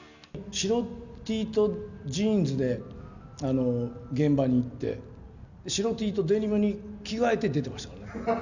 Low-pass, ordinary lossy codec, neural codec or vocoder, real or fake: 7.2 kHz; none; none; real